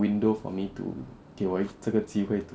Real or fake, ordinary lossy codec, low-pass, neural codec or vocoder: real; none; none; none